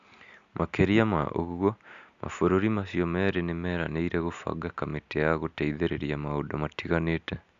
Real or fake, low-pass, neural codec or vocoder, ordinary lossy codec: real; 7.2 kHz; none; Opus, 64 kbps